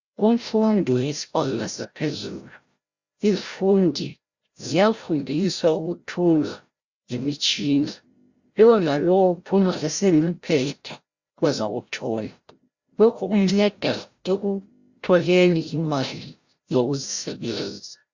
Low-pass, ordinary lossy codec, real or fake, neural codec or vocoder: 7.2 kHz; Opus, 64 kbps; fake; codec, 16 kHz, 0.5 kbps, FreqCodec, larger model